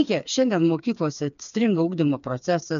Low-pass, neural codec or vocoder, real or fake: 7.2 kHz; codec, 16 kHz, 4 kbps, FreqCodec, smaller model; fake